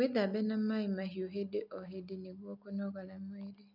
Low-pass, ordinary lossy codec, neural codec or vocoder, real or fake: 5.4 kHz; none; none; real